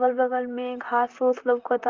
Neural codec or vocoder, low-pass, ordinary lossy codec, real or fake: vocoder, 44.1 kHz, 128 mel bands, Pupu-Vocoder; 7.2 kHz; Opus, 32 kbps; fake